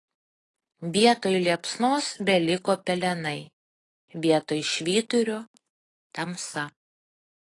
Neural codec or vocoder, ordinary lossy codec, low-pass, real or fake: none; AAC, 32 kbps; 10.8 kHz; real